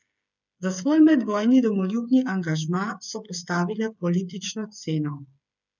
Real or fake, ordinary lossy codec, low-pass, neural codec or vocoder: fake; none; 7.2 kHz; codec, 16 kHz, 8 kbps, FreqCodec, smaller model